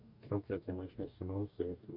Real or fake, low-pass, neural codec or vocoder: fake; 5.4 kHz; codec, 44.1 kHz, 2.6 kbps, DAC